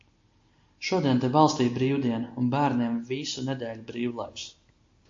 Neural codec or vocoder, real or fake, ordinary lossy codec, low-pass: none; real; AAC, 48 kbps; 7.2 kHz